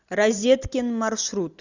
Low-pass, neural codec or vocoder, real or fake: 7.2 kHz; none; real